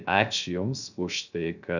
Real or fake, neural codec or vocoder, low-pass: fake; codec, 16 kHz, 0.3 kbps, FocalCodec; 7.2 kHz